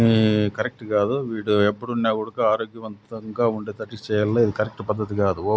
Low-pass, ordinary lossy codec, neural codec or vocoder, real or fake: none; none; none; real